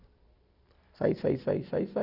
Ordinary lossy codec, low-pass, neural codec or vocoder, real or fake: none; 5.4 kHz; none; real